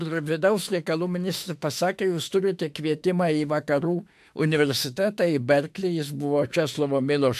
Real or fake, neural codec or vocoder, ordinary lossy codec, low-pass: fake; autoencoder, 48 kHz, 32 numbers a frame, DAC-VAE, trained on Japanese speech; AAC, 96 kbps; 14.4 kHz